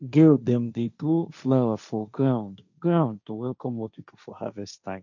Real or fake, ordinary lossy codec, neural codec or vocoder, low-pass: fake; none; codec, 16 kHz, 1.1 kbps, Voila-Tokenizer; 7.2 kHz